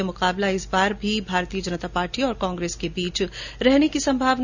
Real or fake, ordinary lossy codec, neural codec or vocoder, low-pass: real; none; none; 7.2 kHz